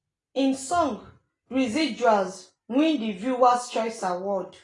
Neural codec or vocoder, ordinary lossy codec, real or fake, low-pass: none; AAC, 32 kbps; real; 10.8 kHz